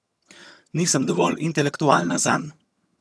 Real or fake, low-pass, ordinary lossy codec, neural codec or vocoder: fake; none; none; vocoder, 22.05 kHz, 80 mel bands, HiFi-GAN